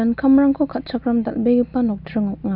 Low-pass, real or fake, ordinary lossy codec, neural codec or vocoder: 5.4 kHz; real; none; none